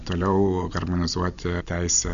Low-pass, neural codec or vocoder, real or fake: 7.2 kHz; none; real